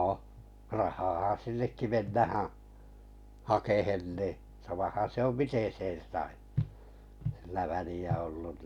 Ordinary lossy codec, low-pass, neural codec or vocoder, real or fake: none; 19.8 kHz; none; real